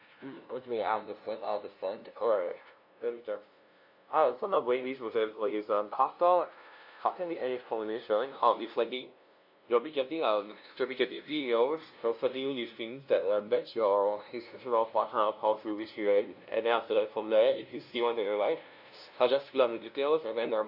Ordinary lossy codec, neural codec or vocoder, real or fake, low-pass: AAC, 48 kbps; codec, 16 kHz, 0.5 kbps, FunCodec, trained on LibriTTS, 25 frames a second; fake; 5.4 kHz